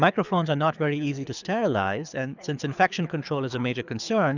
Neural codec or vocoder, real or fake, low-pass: codec, 24 kHz, 6 kbps, HILCodec; fake; 7.2 kHz